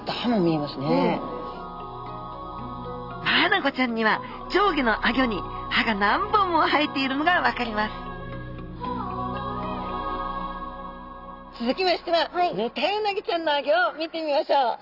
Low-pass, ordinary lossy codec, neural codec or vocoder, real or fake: 5.4 kHz; none; none; real